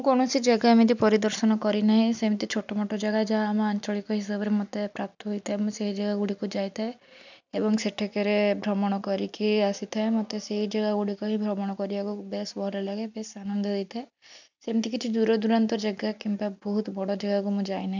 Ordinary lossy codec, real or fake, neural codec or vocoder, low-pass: none; real; none; 7.2 kHz